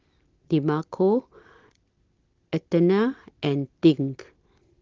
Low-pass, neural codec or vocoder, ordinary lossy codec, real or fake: 7.2 kHz; none; Opus, 16 kbps; real